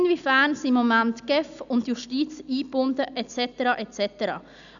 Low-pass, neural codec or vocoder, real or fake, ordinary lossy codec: 7.2 kHz; none; real; none